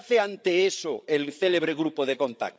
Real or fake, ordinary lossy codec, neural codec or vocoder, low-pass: fake; none; codec, 16 kHz, 8 kbps, FreqCodec, larger model; none